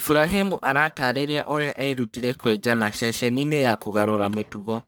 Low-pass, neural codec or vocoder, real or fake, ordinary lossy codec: none; codec, 44.1 kHz, 1.7 kbps, Pupu-Codec; fake; none